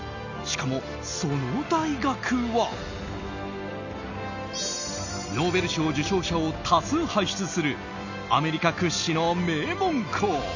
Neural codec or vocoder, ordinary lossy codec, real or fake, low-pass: none; none; real; 7.2 kHz